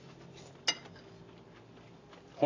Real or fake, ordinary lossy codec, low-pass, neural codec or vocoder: real; AAC, 32 kbps; 7.2 kHz; none